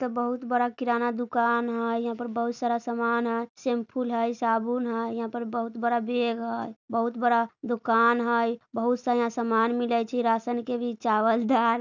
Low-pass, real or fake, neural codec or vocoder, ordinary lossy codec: 7.2 kHz; real; none; none